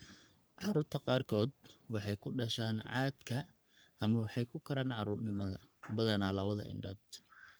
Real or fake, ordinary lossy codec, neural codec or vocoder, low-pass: fake; none; codec, 44.1 kHz, 3.4 kbps, Pupu-Codec; none